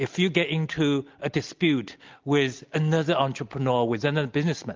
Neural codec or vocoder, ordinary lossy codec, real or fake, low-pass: none; Opus, 24 kbps; real; 7.2 kHz